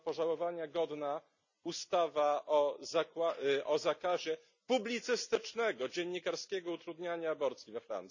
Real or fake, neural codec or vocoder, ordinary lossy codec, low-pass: real; none; none; 7.2 kHz